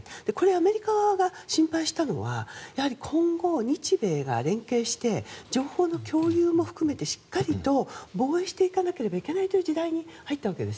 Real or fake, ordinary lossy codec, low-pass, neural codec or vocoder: real; none; none; none